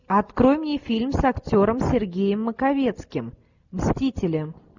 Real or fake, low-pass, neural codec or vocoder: real; 7.2 kHz; none